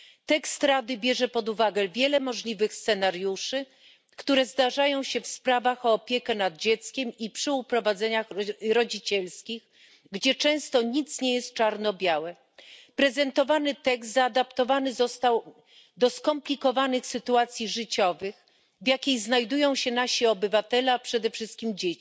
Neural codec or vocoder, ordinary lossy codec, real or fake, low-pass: none; none; real; none